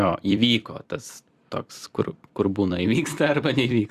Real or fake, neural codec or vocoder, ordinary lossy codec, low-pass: fake; vocoder, 44.1 kHz, 128 mel bands every 256 samples, BigVGAN v2; Opus, 64 kbps; 14.4 kHz